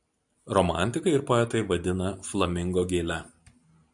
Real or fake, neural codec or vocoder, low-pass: fake; vocoder, 44.1 kHz, 128 mel bands every 512 samples, BigVGAN v2; 10.8 kHz